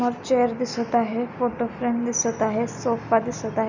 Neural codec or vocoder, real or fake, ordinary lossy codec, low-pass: none; real; none; 7.2 kHz